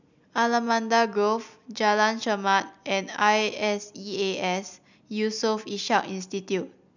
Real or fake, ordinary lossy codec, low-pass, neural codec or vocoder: real; none; 7.2 kHz; none